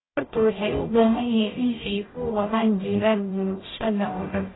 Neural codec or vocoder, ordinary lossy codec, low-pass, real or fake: codec, 44.1 kHz, 0.9 kbps, DAC; AAC, 16 kbps; 7.2 kHz; fake